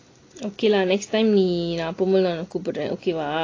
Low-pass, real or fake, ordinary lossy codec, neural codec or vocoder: 7.2 kHz; real; AAC, 32 kbps; none